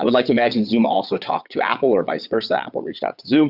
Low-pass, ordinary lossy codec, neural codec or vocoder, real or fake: 5.4 kHz; Opus, 64 kbps; vocoder, 22.05 kHz, 80 mel bands, WaveNeXt; fake